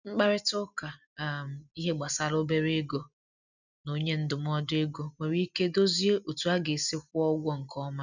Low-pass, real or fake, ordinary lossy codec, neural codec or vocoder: 7.2 kHz; real; none; none